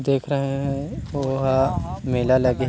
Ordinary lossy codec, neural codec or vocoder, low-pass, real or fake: none; none; none; real